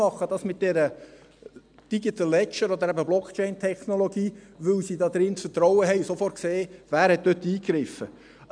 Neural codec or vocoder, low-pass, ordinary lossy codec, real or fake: none; 9.9 kHz; none; real